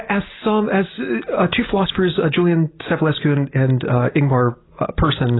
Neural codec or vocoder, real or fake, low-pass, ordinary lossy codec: none; real; 7.2 kHz; AAC, 16 kbps